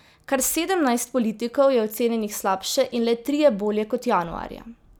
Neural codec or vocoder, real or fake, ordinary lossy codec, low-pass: none; real; none; none